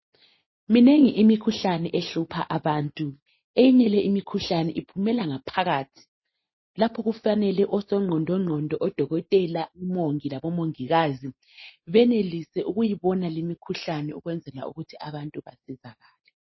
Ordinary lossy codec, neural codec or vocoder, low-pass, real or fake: MP3, 24 kbps; none; 7.2 kHz; real